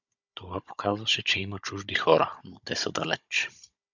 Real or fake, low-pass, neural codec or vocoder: fake; 7.2 kHz; codec, 16 kHz, 16 kbps, FunCodec, trained on Chinese and English, 50 frames a second